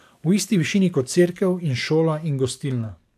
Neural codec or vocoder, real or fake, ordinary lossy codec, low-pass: codec, 44.1 kHz, 7.8 kbps, DAC; fake; none; 14.4 kHz